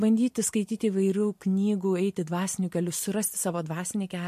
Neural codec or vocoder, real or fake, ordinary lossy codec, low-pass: vocoder, 44.1 kHz, 128 mel bands every 512 samples, BigVGAN v2; fake; MP3, 64 kbps; 14.4 kHz